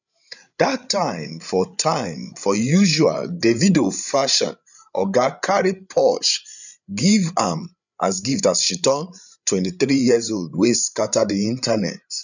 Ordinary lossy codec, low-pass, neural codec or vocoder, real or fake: none; 7.2 kHz; codec, 16 kHz, 8 kbps, FreqCodec, larger model; fake